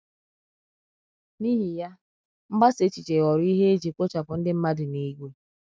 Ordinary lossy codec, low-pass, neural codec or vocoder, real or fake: none; none; none; real